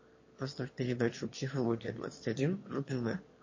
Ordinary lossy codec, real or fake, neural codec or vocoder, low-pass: MP3, 32 kbps; fake; autoencoder, 22.05 kHz, a latent of 192 numbers a frame, VITS, trained on one speaker; 7.2 kHz